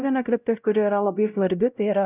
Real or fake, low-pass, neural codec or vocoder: fake; 3.6 kHz; codec, 16 kHz, 0.5 kbps, X-Codec, WavLM features, trained on Multilingual LibriSpeech